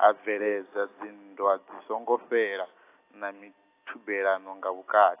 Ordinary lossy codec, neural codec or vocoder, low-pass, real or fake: AAC, 24 kbps; none; 3.6 kHz; real